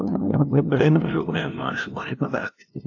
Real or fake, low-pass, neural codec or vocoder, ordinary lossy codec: fake; 7.2 kHz; codec, 16 kHz, 0.5 kbps, FunCodec, trained on LibriTTS, 25 frames a second; AAC, 48 kbps